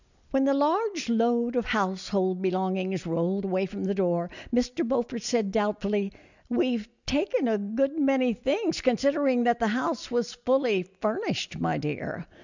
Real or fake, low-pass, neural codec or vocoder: real; 7.2 kHz; none